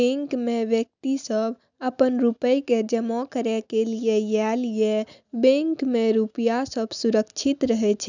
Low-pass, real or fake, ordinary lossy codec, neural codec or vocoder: 7.2 kHz; real; none; none